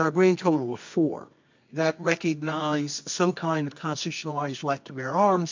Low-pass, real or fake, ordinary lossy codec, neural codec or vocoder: 7.2 kHz; fake; MP3, 64 kbps; codec, 24 kHz, 0.9 kbps, WavTokenizer, medium music audio release